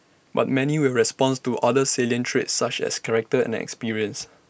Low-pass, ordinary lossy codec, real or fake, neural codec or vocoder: none; none; real; none